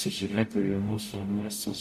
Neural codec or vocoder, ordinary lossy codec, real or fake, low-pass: codec, 44.1 kHz, 0.9 kbps, DAC; MP3, 64 kbps; fake; 14.4 kHz